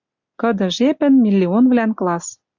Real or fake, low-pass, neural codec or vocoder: real; 7.2 kHz; none